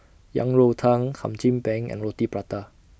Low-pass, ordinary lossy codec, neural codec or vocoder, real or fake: none; none; none; real